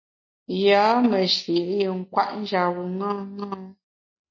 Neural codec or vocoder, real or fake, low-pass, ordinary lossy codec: none; real; 7.2 kHz; MP3, 32 kbps